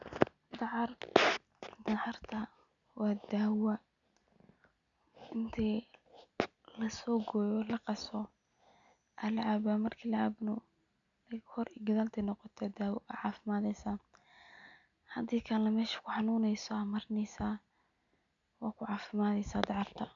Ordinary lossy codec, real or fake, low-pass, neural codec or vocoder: none; real; 7.2 kHz; none